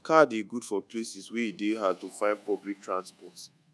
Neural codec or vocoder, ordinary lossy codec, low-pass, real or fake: codec, 24 kHz, 1.2 kbps, DualCodec; none; none; fake